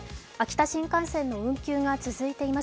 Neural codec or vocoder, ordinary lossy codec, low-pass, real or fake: none; none; none; real